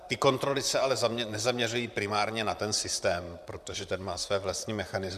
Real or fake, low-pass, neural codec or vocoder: fake; 14.4 kHz; vocoder, 44.1 kHz, 128 mel bands, Pupu-Vocoder